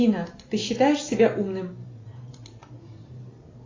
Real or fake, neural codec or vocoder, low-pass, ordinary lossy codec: real; none; 7.2 kHz; AAC, 32 kbps